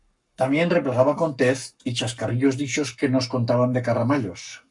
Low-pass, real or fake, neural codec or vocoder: 10.8 kHz; fake; codec, 44.1 kHz, 7.8 kbps, Pupu-Codec